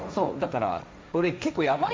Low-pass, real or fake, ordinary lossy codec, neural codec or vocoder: none; fake; none; codec, 16 kHz, 1.1 kbps, Voila-Tokenizer